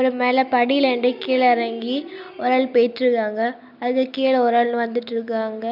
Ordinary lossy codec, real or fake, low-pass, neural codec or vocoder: none; real; 5.4 kHz; none